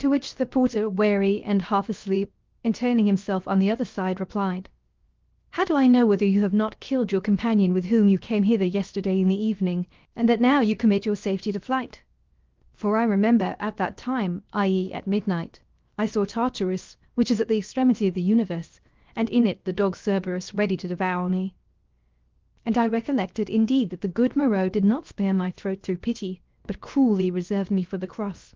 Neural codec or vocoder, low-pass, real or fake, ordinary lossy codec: codec, 16 kHz, 0.7 kbps, FocalCodec; 7.2 kHz; fake; Opus, 32 kbps